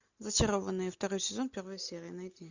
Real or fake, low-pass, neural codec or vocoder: real; 7.2 kHz; none